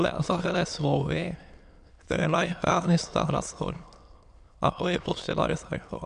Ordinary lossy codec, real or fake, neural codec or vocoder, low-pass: MP3, 64 kbps; fake; autoencoder, 22.05 kHz, a latent of 192 numbers a frame, VITS, trained on many speakers; 9.9 kHz